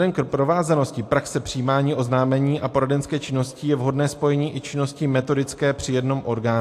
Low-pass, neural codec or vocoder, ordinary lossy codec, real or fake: 14.4 kHz; none; AAC, 64 kbps; real